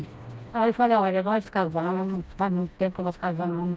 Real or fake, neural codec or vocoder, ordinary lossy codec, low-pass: fake; codec, 16 kHz, 1 kbps, FreqCodec, smaller model; none; none